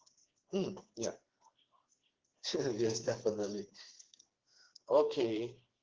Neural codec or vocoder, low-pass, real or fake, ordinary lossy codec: codec, 16 kHz, 4 kbps, FreqCodec, smaller model; 7.2 kHz; fake; Opus, 16 kbps